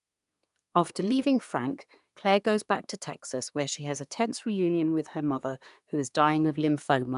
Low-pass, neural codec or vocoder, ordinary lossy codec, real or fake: 10.8 kHz; codec, 24 kHz, 1 kbps, SNAC; none; fake